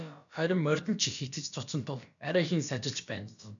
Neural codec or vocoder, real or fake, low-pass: codec, 16 kHz, about 1 kbps, DyCAST, with the encoder's durations; fake; 7.2 kHz